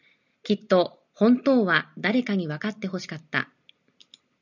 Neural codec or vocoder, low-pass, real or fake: none; 7.2 kHz; real